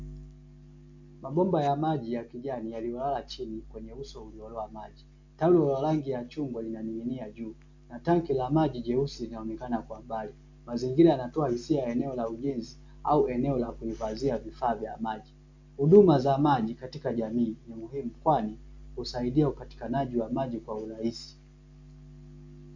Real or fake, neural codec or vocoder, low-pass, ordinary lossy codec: real; none; 7.2 kHz; MP3, 64 kbps